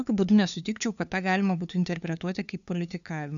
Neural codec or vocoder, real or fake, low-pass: codec, 16 kHz, 2 kbps, FunCodec, trained on LibriTTS, 25 frames a second; fake; 7.2 kHz